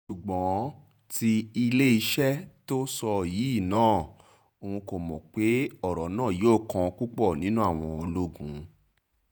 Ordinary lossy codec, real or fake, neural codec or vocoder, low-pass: none; fake; vocoder, 48 kHz, 128 mel bands, Vocos; none